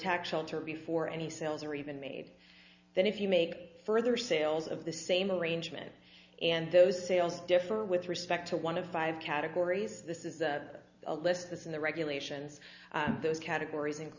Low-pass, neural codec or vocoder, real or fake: 7.2 kHz; none; real